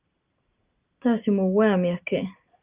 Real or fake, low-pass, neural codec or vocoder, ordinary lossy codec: real; 3.6 kHz; none; Opus, 32 kbps